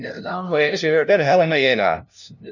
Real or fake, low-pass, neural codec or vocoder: fake; 7.2 kHz; codec, 16 kHz, 0.5 kbps, FunCodec, trained on LibriTTS, 25 frames a second